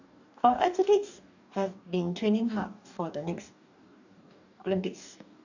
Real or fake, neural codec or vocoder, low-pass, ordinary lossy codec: fake; codec, 44.1 kHz, 2.6 kbps, DAC; 7.2 kHz; none